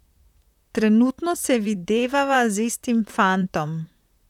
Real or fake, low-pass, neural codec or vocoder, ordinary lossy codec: fake; 19.8 kHz; vocoder, 44.1 kHz, 128 mel bands, Pupu-Vocoder; none